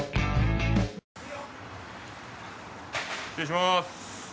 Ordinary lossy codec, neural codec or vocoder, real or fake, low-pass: none; none; real; none